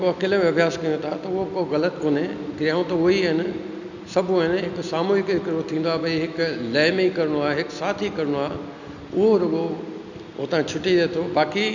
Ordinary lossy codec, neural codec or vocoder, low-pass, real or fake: none; none; 7.2 kHz; real